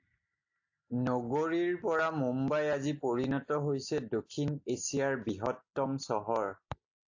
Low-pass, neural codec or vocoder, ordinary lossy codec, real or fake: 7.2 kHz; none; AAC, 48 kbps; real